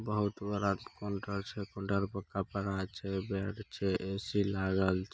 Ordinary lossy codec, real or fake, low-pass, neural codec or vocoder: none; real; none; none